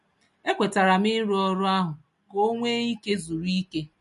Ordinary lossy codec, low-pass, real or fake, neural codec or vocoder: MP3, 48 kbps; 14.4 kHz; real; none